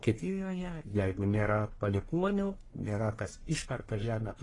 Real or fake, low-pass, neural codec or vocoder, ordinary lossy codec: fake; 10.8 kHz; codec, 44.1 kHz, 1.7 kbps, Pupu-Codec; AAC, 32 kbps